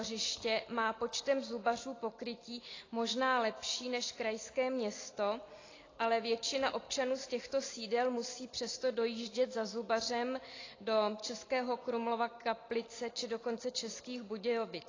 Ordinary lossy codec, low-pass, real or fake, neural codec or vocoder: AAC, 32 kbps; 7.2 kHz; real; none